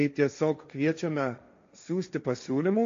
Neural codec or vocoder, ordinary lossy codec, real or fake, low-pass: codec, 16 kHz, 1.1 kbps, Voila-Tokenizer; MP3, 48 kbps; fake; 7.2 kHz